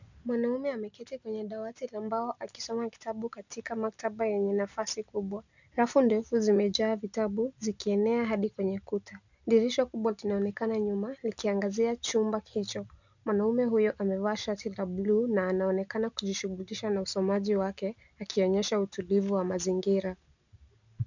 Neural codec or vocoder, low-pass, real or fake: none; 7.2 kHz; real